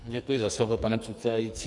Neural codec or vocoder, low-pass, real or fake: codec, 44.1 kHz, 2.6 kbps, SNAC; 10.8 kHz; fake